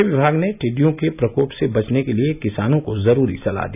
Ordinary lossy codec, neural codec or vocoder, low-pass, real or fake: none; none; 3.6 kHz; real